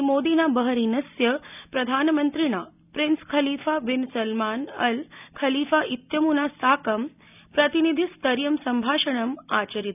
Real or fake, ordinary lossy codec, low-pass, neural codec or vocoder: real; none; 3.6 kHz; none